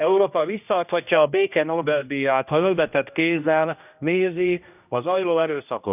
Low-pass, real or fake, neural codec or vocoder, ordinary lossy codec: 3.6 kHz; fake; codec, 16 kHz, 1 kbps, X-Codec, HuBERT features, trained on general audio; none